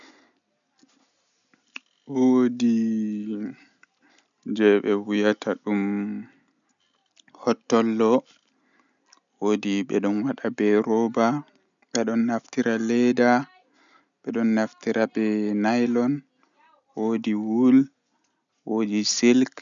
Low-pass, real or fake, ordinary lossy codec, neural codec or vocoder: 7.2 kHz; real; none; none